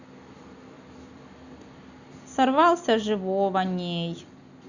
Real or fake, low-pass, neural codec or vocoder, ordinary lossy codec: real; 7.2 kHz; none; Opus, 64 kbps